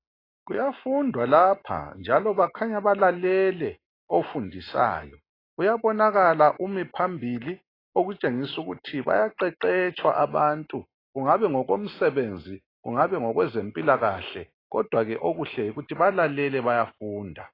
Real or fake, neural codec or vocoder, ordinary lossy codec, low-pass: real; none; AAC, 24 kbps; 5.4 kHz